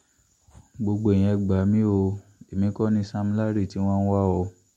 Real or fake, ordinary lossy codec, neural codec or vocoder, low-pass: real; MP3, 64 kbps; none; 19.8 kHz